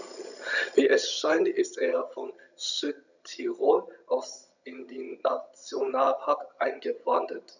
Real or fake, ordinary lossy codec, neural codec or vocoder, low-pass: fake; none; vocoder, 22.05 kHz, 80 mel bands, HiFi-GAN; 7.2 kHz